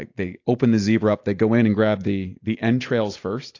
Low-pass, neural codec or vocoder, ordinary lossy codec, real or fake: 7.2 kHz; none; AAC, 48 kbps; real